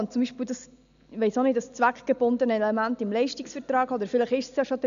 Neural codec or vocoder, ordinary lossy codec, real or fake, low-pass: none; MP3, 96 kbps; real; 7.2 kHz